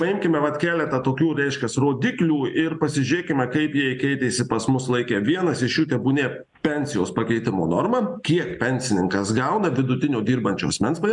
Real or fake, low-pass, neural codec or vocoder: real; 10.8 kHz; none